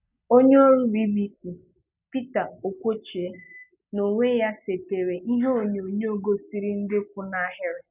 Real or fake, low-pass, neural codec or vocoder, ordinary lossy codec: real; 3.6 kHz; none; none